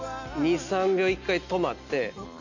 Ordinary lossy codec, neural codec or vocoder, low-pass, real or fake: none; none; 7.2 kHz; real